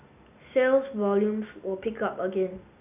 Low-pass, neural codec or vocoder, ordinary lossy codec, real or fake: 3.6 kHz; none; none; real